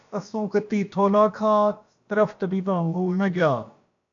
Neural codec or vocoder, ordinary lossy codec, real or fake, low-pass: codec, 16 kHz, about 1 kbps, DyCAST, with the encoder's durations; AAC, 64 kbps; fake; 7.2 kHz